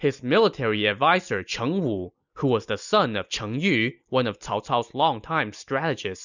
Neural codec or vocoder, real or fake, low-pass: none; real; 7.2 kHz